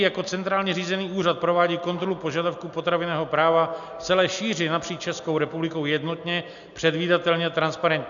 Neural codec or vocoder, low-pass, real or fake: none; 7.2 kHz; real